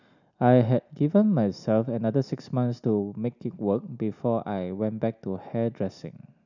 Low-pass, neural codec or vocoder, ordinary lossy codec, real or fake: 7.2 kHz; none; none; real